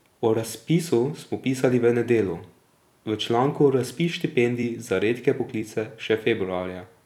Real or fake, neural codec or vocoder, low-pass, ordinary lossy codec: fake; vocoder, 44.1 kHz, 128 mel bands every 256 samples, BigVGAN v2; 19.8 kHz; none